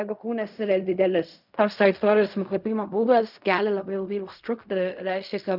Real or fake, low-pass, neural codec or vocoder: fake; 5.4 kHz; codec, 16 kHz in and 24 kHz out, 0.4 kbps, LongCat-Audio-Codec, fine tuned four codebook decoder